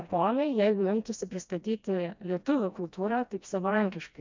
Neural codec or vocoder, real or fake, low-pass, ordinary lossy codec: codec, 16 kHz, 1 kbps, FreqCodec, smaller model; fake; 7.2 kHz; MP3, 64 kbps